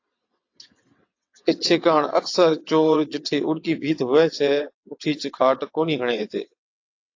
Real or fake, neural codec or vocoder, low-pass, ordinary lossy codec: fake; vocoder, 22.05 kHz, 80 mel bands, WaveNeXt; 7.2 kHz; AAC, 48 kbps